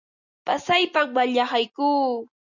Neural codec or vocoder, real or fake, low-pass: none; real; 7.2 kHz